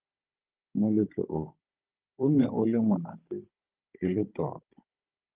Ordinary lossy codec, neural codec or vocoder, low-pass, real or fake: Opus, 32 kbps; codec, 16 kHz, 16 kbps, FunCodec, trained on Chinese and English, 50 frames a second; 3.6 kHz; fake